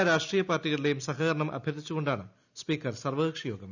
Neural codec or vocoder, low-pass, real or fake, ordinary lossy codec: none; 7.2 kHz; real; none